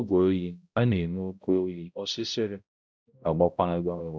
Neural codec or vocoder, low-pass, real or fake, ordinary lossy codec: codec, 16 kHz, 0.5 kbps, X-Codec, HuBERT features, trained on balanced general audio; none; fake; none